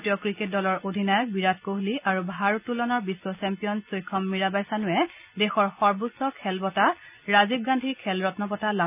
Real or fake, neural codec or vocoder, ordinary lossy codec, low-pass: real; none; none; 3.6 kHz